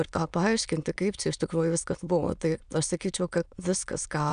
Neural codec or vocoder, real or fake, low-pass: autoencoder, 22.05 kHz, a latent of 192 numbers a frame, VITS, trained on many speakers; fake; 9.9 kHz